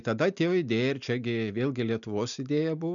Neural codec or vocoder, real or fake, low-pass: none; real; 7.2 kHz